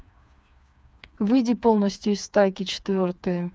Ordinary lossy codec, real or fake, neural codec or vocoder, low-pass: none; fake; codec, 16 kHz, 4 kbps, FreqCodec, smaller model; none